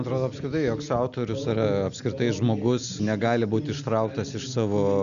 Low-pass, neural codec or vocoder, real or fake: 7.2 kHz; none; real